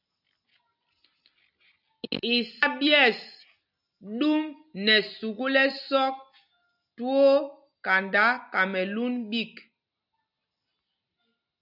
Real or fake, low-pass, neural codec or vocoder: real; 5.4 kHz; none